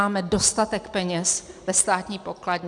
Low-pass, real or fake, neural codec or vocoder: 10.8 kHz; real; none